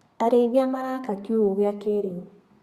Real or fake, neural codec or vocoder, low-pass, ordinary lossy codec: fake; codec, 32 kHz, 1.9 kbps, SNAC; 14.4 kHz; Opus, 64 kbps